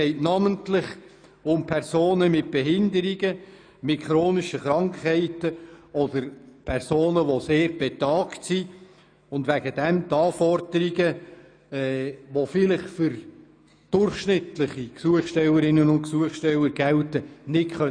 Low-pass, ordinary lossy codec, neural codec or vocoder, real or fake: 9.9 kHz; none; autoencoder, 48 kHz, 128 numbers a frame, DAC-VAE, trained on Japanese speech; fake